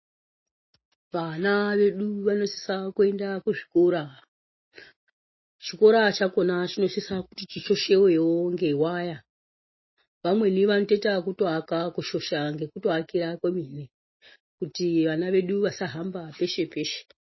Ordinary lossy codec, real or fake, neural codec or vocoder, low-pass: MP3, 24 kbps; real; none; 7.2 kHz